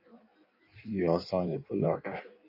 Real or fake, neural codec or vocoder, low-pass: fake; codec, 16 kHz in and 24 kHz out, 1.1 kbps, FireRedTTS-2 codec; 5.4 kHz